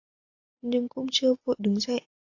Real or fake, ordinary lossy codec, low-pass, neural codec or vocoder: real; AAC, 48 kbps; 7.2 kHz; none